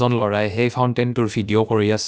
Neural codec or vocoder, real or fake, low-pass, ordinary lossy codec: codec, 16 kHz, about 1 kbps, DyCAST, with the encoder's durations; fake; none; none